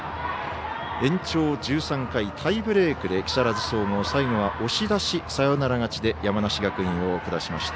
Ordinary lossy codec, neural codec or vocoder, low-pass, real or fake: none; none; none; real